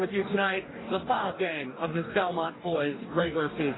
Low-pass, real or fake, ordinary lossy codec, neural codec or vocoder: 7.2 kHz; fake; AAC, 16 kbps; codec, 44.1 kHz, 2.6 kbps, DAC